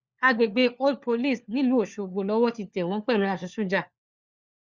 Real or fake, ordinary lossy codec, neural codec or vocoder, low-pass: fake; Opus, 64 kbps; codec, 16 kHz, 4 kbps, FunCodec, trained on LibriTTS, 50 frames a second; 7.2 kHz